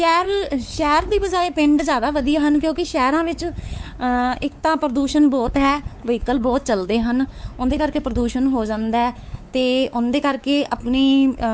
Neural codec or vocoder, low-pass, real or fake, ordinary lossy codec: codec, 16 kHz, 4 kbps, X-Codec, WavLM features, trained on Multilingual LibriSpeech; none; fake; none